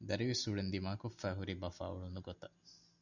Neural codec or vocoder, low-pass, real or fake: none; 7.2 kHz; real